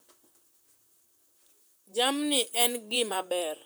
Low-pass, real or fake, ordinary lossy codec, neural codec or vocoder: none; fake; none; vocoder, 44.1 kHz, 128 mel bands, Pupu-Vocoder